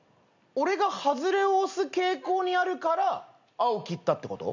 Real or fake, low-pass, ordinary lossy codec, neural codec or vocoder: real; 7.2 kHz; none; none